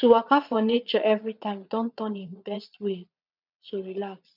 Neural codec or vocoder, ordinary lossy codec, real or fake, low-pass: vocoder, 22.05 kHz, 80 mel bands, Vocos; none; fake; 5.4 kHz